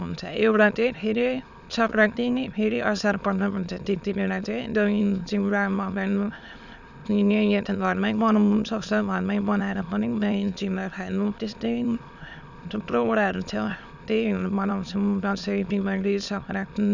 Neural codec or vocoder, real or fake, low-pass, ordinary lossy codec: autoencoder, 22.05 kHz, a latent of 192 numbers a frame, VITS, trained on many speakers; fake; 7.2 kHz; none